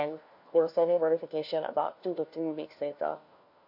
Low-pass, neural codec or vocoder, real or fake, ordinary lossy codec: 5.4 kHz; codec, 16 kHz, 1 kbps, FunCodec, trained on LibriTTS, 50 frames a second; fake; MP3, 32 kbps